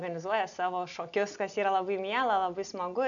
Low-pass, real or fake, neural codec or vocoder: 7.2 kHz; real; none